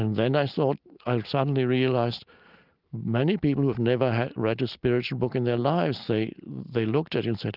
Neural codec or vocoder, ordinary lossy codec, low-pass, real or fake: none; Opus, 24 kbps; 5.4 kHz; real